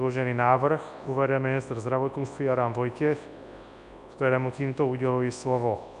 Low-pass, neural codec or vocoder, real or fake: 10.8 kHz; codec, 24 kHz, 0.9 kbps, WavTokenizer, large speech release; fake